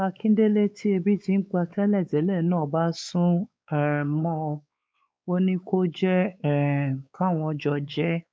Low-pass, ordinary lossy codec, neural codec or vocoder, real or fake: none; none; codec, 16 kHz, 4 kbps, X-Codec, WavLM features, trained on Multilingual LibriSpeech; fake